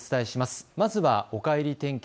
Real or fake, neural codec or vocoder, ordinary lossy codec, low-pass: real; none; none; none